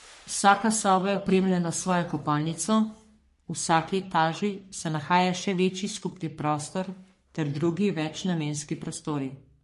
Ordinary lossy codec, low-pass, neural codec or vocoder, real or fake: MP3, 48 kbps; 14.4 kHz; codec, 44.1 kHz, 3.4 kbps, Pupu-Codec; fake